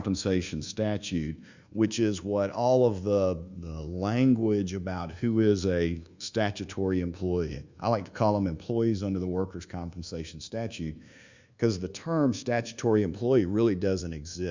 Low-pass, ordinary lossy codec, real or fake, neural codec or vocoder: 7.2 kHz; Opus, 64 kbps; fake; codec, 24 kHz, 1.2 kbps, DualCodec